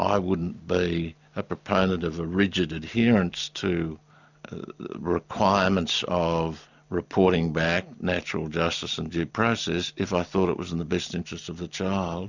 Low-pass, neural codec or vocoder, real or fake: 7.2 kHz; none; real